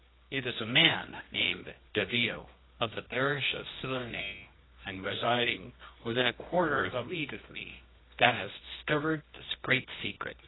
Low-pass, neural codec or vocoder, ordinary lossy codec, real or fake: 7.2 kHz; codec, 24 kHz, 0.9 kbps, WavTokenizer, medium music audio release; AAC, 16 kbps; fake